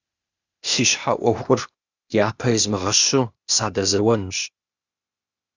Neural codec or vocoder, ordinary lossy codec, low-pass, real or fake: codec, 16 kHz, 0.8 kbps, ZipCodec; Opus, 64 kbps; 7.2 kHz; fake